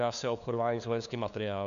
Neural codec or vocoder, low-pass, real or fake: codec, 16 kHz, 2 kbps, FunCodec, trained on LibriTTS, 25 frames a second; 7.2 kHz; fake